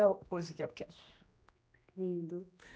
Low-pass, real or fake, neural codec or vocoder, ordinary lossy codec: none; fake; codec, 16 kHz, 1 kbps, X-Codec, HuBERT features, trained on general audio; none